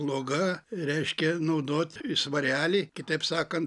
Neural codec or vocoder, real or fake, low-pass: none; real; 10.8 kHz